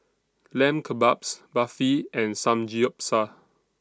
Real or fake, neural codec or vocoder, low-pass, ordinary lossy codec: real; none; none; none